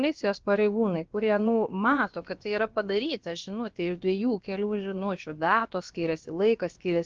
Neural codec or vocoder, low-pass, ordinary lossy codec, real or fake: codec, 16 kHz, about 1 kbps, DyCAST, with the encoder's durations; 7.2 kHz; Opus, 24 kbps; fake